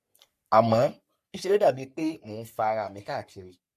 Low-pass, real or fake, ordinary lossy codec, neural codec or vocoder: 14.4 kHz; fake; MP3, 64 kbps; codec, 44.1 kHz, 3.4 kbps, Pupu-Codec